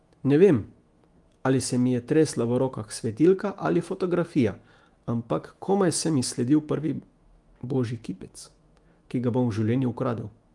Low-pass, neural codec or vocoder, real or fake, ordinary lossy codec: 10.8 kHz; autoencoder, 48 kHz, 128 numbers a frame, DAC-VAE, trained on Japanese speech; fake; Opus, 24 kbps